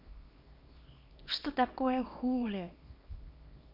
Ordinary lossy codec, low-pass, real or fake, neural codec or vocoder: none; 5.4 kHz; fake; codec, 24 kHz, 0.9 kbps, WavTokenizer, small release